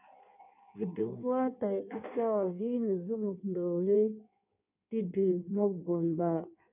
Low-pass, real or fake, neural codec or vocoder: 3.6 kHz; fake; codec, 16 kHz in and 24 kHz out, 1.1 kbps, FireRedTTS-2 codec